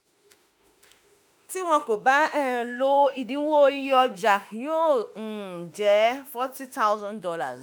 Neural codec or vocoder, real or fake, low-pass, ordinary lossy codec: autoencoder, 48 kHz, 32 numbers a frame, DAC-VAE, trained on Japanese speech; fake; none; none